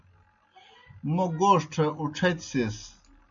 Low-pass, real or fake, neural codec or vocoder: 7.2 kHz; real; none